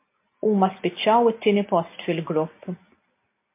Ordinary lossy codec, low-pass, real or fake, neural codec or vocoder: MP3, 24 kbps; 3.6 kHz; real; none